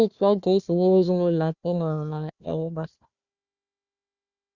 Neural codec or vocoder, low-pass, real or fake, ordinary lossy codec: codec, 16 kHz, 1 kbps, FunCodec, trained on Chinese and English, 50 frames a second; 7.2 kHz; fake; Opus, 64 kbps